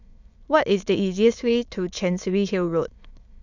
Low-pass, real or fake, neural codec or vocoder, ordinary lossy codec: 7.2 kHz; fake; autoencoder, 22.05 kHz, a latent of 192 numbers a frame, VITS, trained on many speakers; none